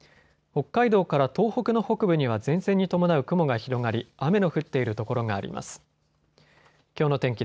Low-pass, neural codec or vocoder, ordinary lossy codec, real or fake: none; none; none; real